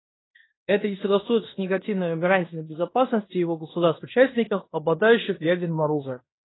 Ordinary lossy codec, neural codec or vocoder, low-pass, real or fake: AAC, 16 kbps; codec, 16 kHz in and 24 kHz out, 0.9 kbps, LongCat-Audio-Codec, fine tuned four codebook decoder; 7.2 kHz; fake